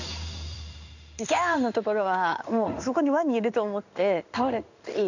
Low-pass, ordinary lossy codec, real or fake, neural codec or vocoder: 7.2 kHz; none; fake; codec, 16 kHz in and 24 kHz out, 1 kbps, XY-Tokenizer